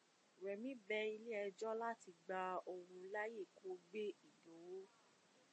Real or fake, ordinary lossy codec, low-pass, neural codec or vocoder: real; MP3, 32 kbps; 9.9 kHz; none